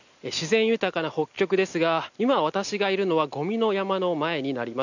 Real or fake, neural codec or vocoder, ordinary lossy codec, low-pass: real; none; none; 7.2 kHz